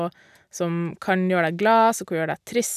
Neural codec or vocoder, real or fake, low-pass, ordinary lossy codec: none; real; 14.4 kHz; none